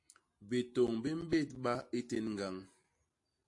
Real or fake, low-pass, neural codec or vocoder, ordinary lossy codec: real; 10.8 kHz; none; AAC, 48 kbps